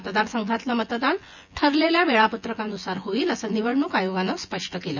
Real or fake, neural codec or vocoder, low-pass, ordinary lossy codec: fake; vocoder, 24 kHz, 100 mel bands, Vocos; 7.2 kHz; none